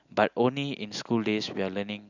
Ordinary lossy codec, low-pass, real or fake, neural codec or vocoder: none; 7.2 kHz; real; none